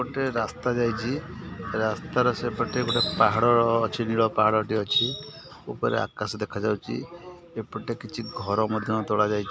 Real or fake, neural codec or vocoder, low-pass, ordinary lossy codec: real; none; none; none